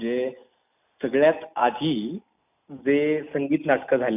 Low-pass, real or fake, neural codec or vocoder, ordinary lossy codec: 3.6 kHz; real; none; none